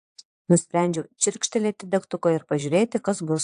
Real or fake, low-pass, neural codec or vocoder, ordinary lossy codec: fake; 9.9 kHz; vocoder, 22.05 kHz, 80 mel bands, Vocos; AAC, 64 kbps